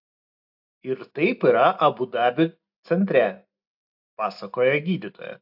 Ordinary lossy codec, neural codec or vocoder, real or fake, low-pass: AAC, 48 kbps; none; real; 5.4 kHz